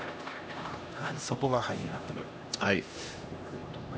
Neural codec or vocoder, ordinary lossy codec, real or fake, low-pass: codec, 16 kHz, 1 kbps, X-Codec, HuBERT features, trained on LibriSpeech; none; fake; none